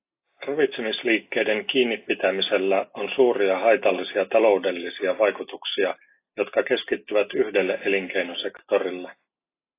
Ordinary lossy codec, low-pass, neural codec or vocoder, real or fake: AAC, 24 kbps; 3.6 kHz; none; real